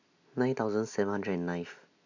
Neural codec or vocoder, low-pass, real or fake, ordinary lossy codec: none; 7.2 kHz; real; none